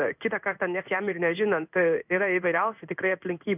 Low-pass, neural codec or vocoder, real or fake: 3.6 kHz; codec, 16 kHz in and 24 kHz out, 1 kbps, XY-Tokenizer; fake